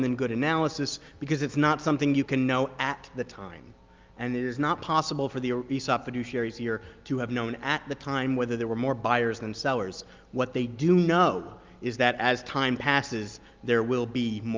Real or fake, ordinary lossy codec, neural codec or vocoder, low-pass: real; Opus, 24 kbps; none; 7.2 kHz